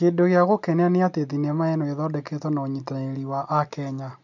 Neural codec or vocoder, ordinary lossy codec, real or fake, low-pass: none; none; real; 7.2 kHz